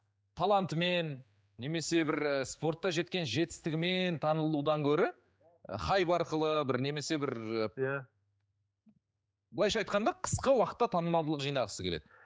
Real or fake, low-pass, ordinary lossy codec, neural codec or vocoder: fake; none; none; codec, 16 kHz, 4 kbps, X-Codec, HuBERT features, trained on general audio